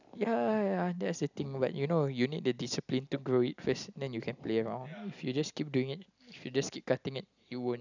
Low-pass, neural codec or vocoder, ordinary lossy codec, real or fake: 7.2 kHz; none; none; real